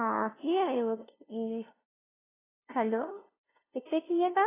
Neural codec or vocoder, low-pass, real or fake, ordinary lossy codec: codec, 16 kHz, 0.5 kbps, FunCodec, trained on LibriTTS, 25 frames a second; 3.6 kHz; fake; AAC, 16 kbps